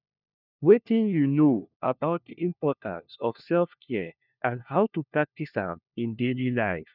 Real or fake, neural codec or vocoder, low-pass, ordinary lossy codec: fake; codec, 16 kHz, 1 kbps, FunCodec, trained on LibriTTS, 50 frames a second; 5.4 kHz; none